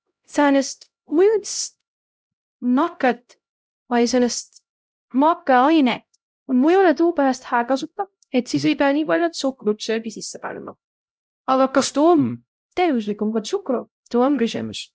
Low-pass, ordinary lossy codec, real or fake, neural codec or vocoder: none; none; fake; codec, 16 kHz, 0.5 kbps, X-Codec, HuBERT features, trained on LibriSpeech